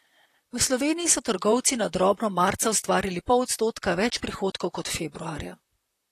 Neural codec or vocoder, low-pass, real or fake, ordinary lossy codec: vocoder, 44.1 kHz, 128 mel bands, Pupu-Vocoder; 14.4 kHz; fake; AAC, 48 kbps